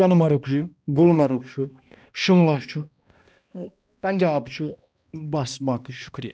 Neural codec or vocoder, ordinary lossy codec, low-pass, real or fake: codec, 16 kHz, 1 kbps, X-Codec, HuBERT features, trained on balanced general audio; none; none; fake